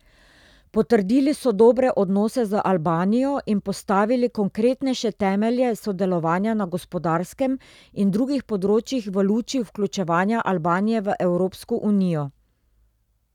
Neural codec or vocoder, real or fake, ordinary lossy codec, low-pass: none; real; none; 19.8 kHz